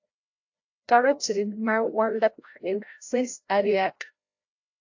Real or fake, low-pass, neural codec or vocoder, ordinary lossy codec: fake; 7.2 kHz; codec, 16 kHz, 0.5 kbps, FreqCodec, larger model; AAC, 48 kbps